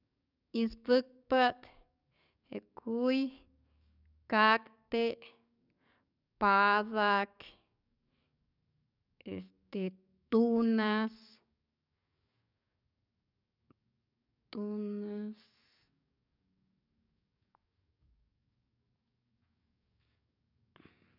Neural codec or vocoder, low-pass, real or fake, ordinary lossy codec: codec, 44.1 kHz, 7.8 kbps, DAC; 5.4 kHz; fake; none